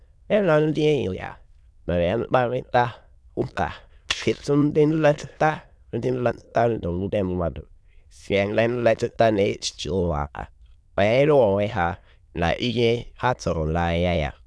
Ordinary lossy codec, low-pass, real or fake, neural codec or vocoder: none; none; fake; autoencoder, 22.05 kHz, a latent of 192 numbers a frame, VITS, trained on many speakers